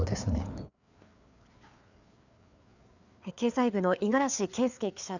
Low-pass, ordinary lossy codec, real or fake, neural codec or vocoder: 7.2 kHz; none; fake; codec, 16 kHz, 4 kbps, FreqCodec, larger model